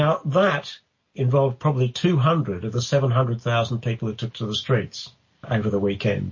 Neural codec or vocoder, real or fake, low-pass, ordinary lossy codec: none; real; 7.2 kHz; MP3, 32 kbps